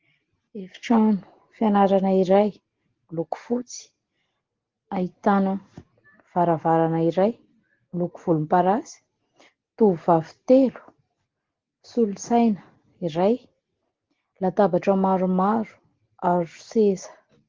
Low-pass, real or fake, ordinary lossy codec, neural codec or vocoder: 7.2 kHz; real; Opus, 16 kbps; none